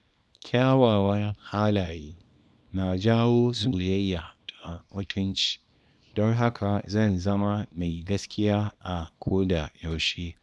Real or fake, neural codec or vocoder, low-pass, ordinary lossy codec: fake; codec, 24 kHz, 0.9 kbps, WavTokenizer, small release; none; none